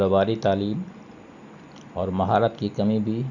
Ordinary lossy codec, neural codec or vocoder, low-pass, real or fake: none; none; 7.2 kHz; real